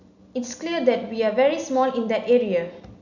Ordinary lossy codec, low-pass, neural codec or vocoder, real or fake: none; 7.2 kHz; none; real